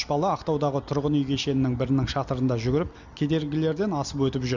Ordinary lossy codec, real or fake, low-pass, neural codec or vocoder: none; real; 7.2 kHz; none